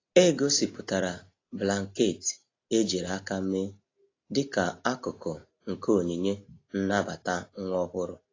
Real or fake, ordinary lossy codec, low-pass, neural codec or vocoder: real; AAC, 32 kbps; 7.2 kHz; none